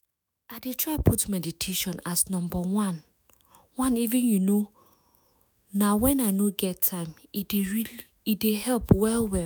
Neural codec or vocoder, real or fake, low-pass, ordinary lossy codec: autoencoder, 48 kHz, 128 numbers a frame, DAC-VAE, trained on Japanese speech; fake; none; none